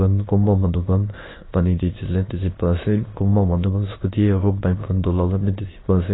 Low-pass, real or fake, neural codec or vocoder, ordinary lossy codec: 7.2 kHz; fake; autoencoder, 22.05 kHz, a latent of 192 numbers a frame, VITS, trained on many speakers; AAC, 16 kbps